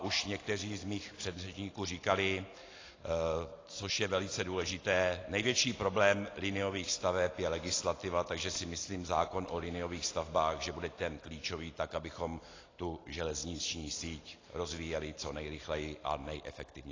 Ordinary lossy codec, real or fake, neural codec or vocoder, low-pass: AAC, 32 kbps; real; none; 7.2 kHz